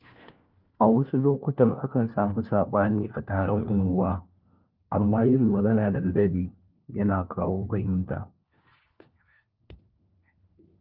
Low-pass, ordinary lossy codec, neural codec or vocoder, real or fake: 5.4 kHz; Opus, 32 kbps; codec, 16 kHz, 1 kbps, FunCodec, trained on LibriTTS, 50 frames a second; fake